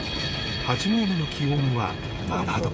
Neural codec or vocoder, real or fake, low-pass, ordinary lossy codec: codec, 16 kHz, 16 kbps, FreqCodec, smaller model; fake; none; none